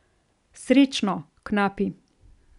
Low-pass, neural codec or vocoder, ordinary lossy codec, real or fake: 10.8 kHz; none; none; real